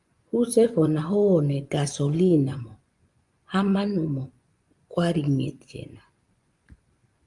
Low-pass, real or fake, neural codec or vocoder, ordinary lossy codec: 10.8 kHz; fake; vocoder, 24 kHz, 100 mel bands, Vocos; Opus, 32 kbps